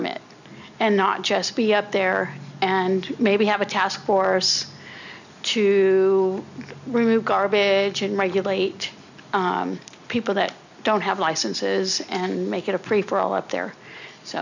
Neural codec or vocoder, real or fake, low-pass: none; real; 7.2 kHz